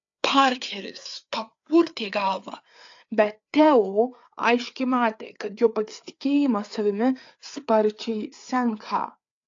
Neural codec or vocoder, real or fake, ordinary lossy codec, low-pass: codec, 16 kHz, 4 kbps, FreqCodec, larger model; fake; AAC, 48 kbps; 7.2 kHz